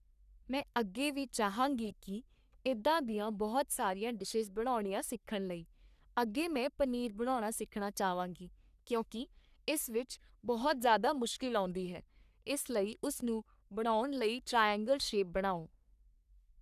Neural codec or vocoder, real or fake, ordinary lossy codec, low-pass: codec, 44.1 kHz, 3.4 kbps, Pupu-Codec; fake; none; 14.4 kHz